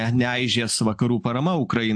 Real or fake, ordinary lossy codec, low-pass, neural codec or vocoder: real; AAC, 64 kbps; 9.9 kHz; none